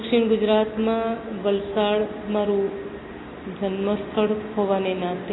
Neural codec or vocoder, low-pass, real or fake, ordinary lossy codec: none; 7.2 kHz; real; AAC, 16 kbps